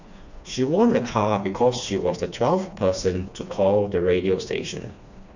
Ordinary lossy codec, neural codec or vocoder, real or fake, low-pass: none; codec, 16 kHz, 2 kbps, FreqCodec, smaller model; fake; 7.2 kHz